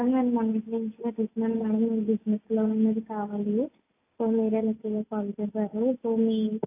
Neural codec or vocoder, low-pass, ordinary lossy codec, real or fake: none; 3.6 kHz; AAC, 24 kbps; real